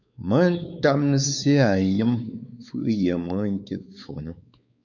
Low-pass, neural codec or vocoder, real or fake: 7.2 kHz; codec, 16 kHz, 4 kbps, X-Codec, WavLM features, trained on Multilingual LibriSpeech; fake